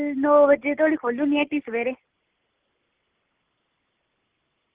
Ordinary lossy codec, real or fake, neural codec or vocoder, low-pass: Opus, 16 kbps; real; none; 3.6 kHz